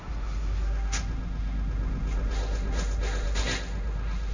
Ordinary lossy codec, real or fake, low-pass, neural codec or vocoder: none; fake; 7.2 kHz; codec, 16 kHz, 1.1 kbps, Voila-Tokenizer